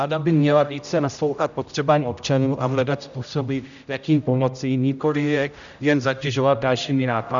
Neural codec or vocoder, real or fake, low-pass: codec, 16 kHz, 0.5 kbps, X-Codec, HuBERT features, trained on general audio; fake; 7.2 kHz